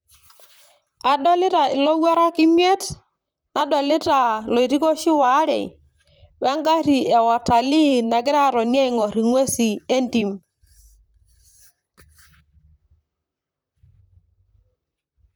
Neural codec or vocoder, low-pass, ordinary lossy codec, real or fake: vocoder, 44.1 kHz, 128 mel bands, Pupu-Vocoder; none; none; fake